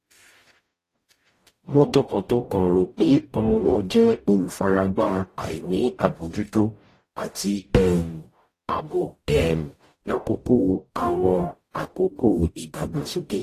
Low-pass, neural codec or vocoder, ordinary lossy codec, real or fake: 14.4 kHz; codec, 44.1 kHz, 0.9 kbps, DAC; AAC, 48 kbps; fake